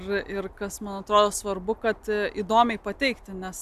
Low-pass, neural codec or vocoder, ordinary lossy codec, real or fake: 14.4 kHz; none; AAC, 96 kbps; real